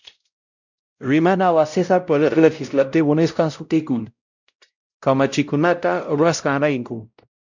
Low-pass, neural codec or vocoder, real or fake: 7.2 kHz; codec, 16 kHz, 0.5 kbps, X-Codec, WavLM features, trained on Multilingual LibriSpeech; fake